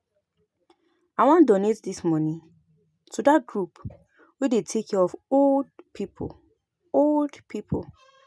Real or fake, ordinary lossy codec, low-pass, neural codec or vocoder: real; none; none; none